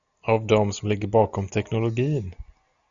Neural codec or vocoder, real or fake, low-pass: none; real; 7.2 kHz